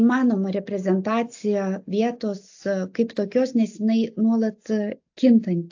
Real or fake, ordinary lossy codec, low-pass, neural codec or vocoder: real; AAC, 48 kbps; 7.2 kHz; none